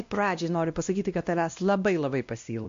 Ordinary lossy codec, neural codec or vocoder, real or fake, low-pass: MP3, 48 kbps; codec, 16 kHz, 1 kbps, X-Codec, WavLM features, trained on Multilingual LibriSpeech; fake; 7.2 kHz